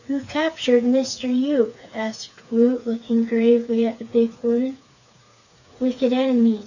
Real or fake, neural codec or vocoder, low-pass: fake; codec, 16 kHz, 4 kbps, FreqCodec, smaller model; 7.2 kHz